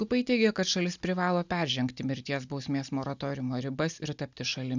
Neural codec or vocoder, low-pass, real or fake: none; 7.2 kHz; real